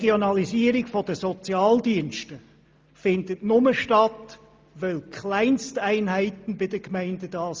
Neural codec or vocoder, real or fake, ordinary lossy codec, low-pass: none; real; Opus, 24 kbps; 7.2 kHz